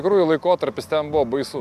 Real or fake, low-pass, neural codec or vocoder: real; 14.4 kHz; none